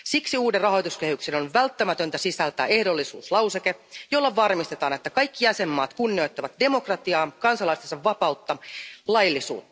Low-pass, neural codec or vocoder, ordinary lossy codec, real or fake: none; none; none; real